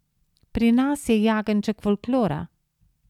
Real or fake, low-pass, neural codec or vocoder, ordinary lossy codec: real; 19.8 kHz; none; none